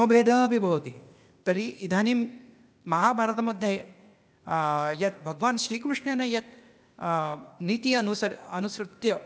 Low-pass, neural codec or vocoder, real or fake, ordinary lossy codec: none; codec, 16 kHz, 0.8 kbps, ZipCodec; fake; none